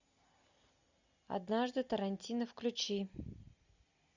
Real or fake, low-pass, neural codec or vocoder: real; 7.2 kHz; none